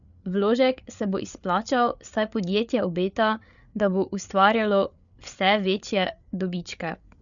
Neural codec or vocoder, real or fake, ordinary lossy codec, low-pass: codec, 16 kHz, 8 kbps, FreqCodec, larger model; fake; none; 7.2 kHz